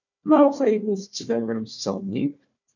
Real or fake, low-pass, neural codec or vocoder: fake; 7.2 kHz; codec, 16 kHz, 1 kbps, FunCodec, trained on Chinese and English, 50 frames a second